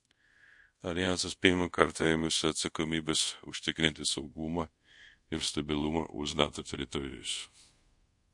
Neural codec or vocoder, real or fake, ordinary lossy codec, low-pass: codec, 24 kHz, 0.5 kbps, DualCodec; fake; MP3, 48 kbps; 10.8 kHz